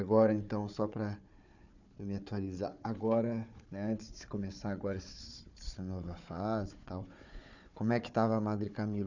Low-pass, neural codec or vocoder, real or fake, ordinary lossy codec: 7.2 kHz; codec, 16 kHz, 4 kbps, FunCodec, trained on Chinese and English, 50 frames a second; fake; none